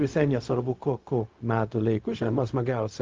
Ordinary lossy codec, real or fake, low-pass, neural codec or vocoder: Opus, 32 kbps; fake; 7.2 kHz; codec, 16 kHz, 0.4 kbps, LongCat-Audio-Codec